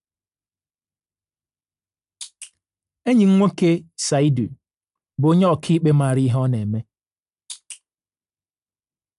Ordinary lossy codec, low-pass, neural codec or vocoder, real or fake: AAC, 96 kbps; 10.8 kHz; none; real